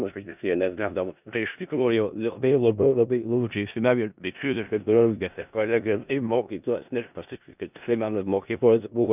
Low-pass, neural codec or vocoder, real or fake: 3.6 kHz; codec, 16 kHz in and 24 kHz out, 0.4 kbps, LongCat-Audio-Codec, four codebook decoder; fake